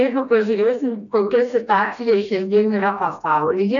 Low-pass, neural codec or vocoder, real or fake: 7.2 kHz; codec, 16 kHz, 1 kbps, FreqCodec, smaller model; fake